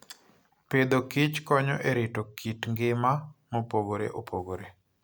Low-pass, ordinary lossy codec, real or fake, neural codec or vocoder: none; none; real; none